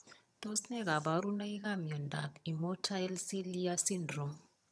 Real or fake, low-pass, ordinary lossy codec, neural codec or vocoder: fake; none; none; vocoder, 22.05 kHz, 80 mel bands, HiFi-GAN